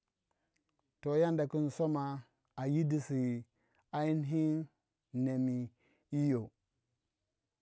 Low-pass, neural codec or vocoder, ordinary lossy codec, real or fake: none; none; none; real